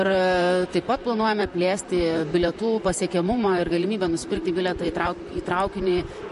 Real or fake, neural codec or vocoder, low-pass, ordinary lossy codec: fake; vocoder, 44.1 kHz, 128 mel bands, Pupu-Vocoder; 14.4 kHz; MP3, 48 kbps